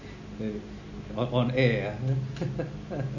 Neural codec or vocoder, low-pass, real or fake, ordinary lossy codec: none; 7.2 kHz; real; none